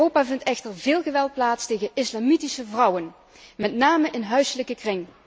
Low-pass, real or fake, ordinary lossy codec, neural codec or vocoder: none; real; none; none